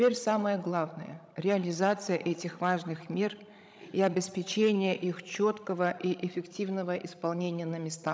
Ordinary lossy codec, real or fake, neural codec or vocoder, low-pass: none; fake; codec, 16 kHz, 16 kbps, FreqCodec, larger model; none